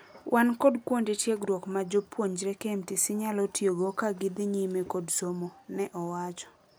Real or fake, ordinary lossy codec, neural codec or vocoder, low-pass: real; none; none; none